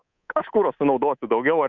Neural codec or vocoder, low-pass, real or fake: codec, 24 kHz, 3.1 kbps, DualCodec; 7.2 kHz; fake